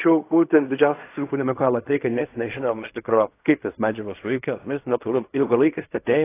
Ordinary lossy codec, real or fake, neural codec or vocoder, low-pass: AAC, 24 kbps; fake; codec, 16 kHz in and 24 kHz out, 0.4 kbps, LongCat-Audio-Codec, fine tuned four codebook decoder; 3.6 kHz